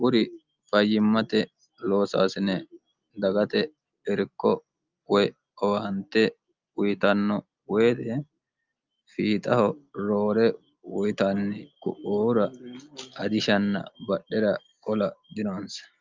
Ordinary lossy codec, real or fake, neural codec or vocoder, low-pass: Opus, 24 kbps; real; none; 7.2 kHz